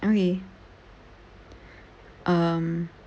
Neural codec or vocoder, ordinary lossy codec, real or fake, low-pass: none; none; real; none